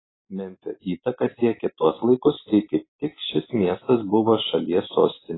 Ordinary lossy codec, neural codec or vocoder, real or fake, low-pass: AAC, 16 kbps; none; real; 7.2 kHz